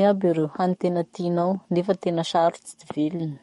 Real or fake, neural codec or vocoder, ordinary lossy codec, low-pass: fake; codec, 44.1 kHz, 7.8 kbps, DAC; MP3, 48 kbps; 19.8 kHz